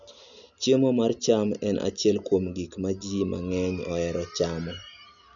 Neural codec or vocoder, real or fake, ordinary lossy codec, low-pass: none; real; none; 7.2 kHz